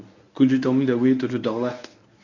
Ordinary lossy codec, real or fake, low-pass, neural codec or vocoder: none; fake; 7.2 kHz; codec, 24 kHz, 0.9 kbps, WavTokenizer, medium speech release version 1